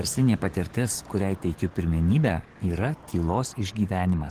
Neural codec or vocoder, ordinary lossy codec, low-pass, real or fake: codec, 44.1 kHz, 7.8 kbps, DAC; Opus, 16 kbps; 14.4 kHz; fake